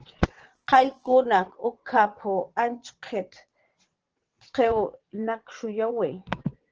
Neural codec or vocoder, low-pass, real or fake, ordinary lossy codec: vocoder, 22.05 kHz, 80 mel bands, WaveNeXt; 7.2 kHz; fake; Opus, 16 kbps